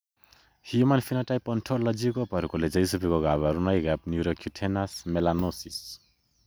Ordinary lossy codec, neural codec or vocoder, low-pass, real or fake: none; none; none; real